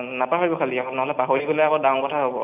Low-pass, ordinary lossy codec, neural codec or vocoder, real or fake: 3.6 kHz; AAC, 32 kbps; none; real